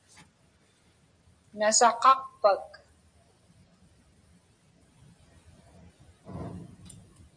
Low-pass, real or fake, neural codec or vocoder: 9.9 kHz; real; none